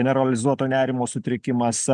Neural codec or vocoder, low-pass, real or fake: none; 10.8 kHz; real